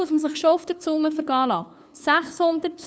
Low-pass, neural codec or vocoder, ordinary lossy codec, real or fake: none; codec, 16 kHz, 2 kbps, FunCodec, trained on LibriTTS, 25 frames a second; none; fake